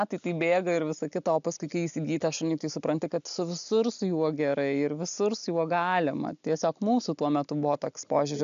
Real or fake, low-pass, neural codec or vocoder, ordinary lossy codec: real; 7.2 kHz; none; AAC, 96 kbps